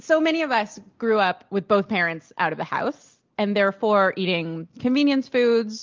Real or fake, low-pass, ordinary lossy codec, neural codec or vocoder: real; 7.2 kHz; Opus, 24 kbps; none